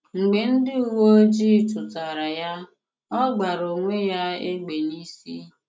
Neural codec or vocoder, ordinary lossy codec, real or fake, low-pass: none; none; real; none